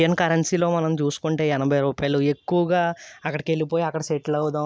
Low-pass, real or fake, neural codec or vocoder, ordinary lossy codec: none; real; none; none